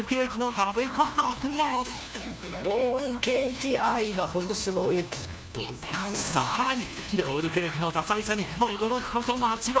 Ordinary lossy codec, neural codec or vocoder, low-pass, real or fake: none; codec, 16 kHz, 1 kbps, FunCodec, trained on LibriTTS, 50 frames a second; none; fake